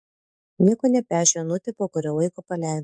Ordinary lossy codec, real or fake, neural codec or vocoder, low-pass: MP3, 64 kbps; fake; codec, 44.1 kHz, 7.8 kbps, DAC; 9.9 kHz